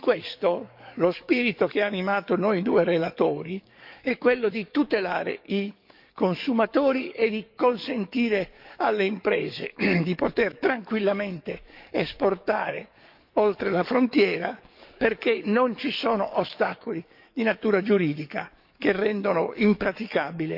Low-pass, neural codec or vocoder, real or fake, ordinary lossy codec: 5.4 kHz; codec, 44.1 kHz, 7.8 kbps, DAC; fake; none